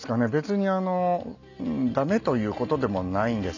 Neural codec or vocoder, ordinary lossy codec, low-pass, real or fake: none; none; 7.2 kHz; real